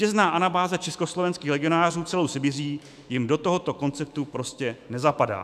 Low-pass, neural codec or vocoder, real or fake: 14.4 kHz; autoencoder, 48 kHz, 128 numbers a frame, DAC-VAE, trained on Japanese speech; fake